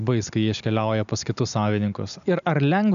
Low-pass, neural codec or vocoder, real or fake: 7.2 kHz; none; real